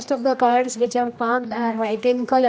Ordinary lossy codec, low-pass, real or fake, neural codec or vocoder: none; none; fake; codec, 16 kHz, 1 kbps, X-Codec, HuBERT features, trained on general audio